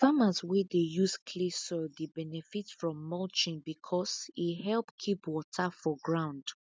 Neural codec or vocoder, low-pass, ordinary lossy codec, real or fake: none; none; none; real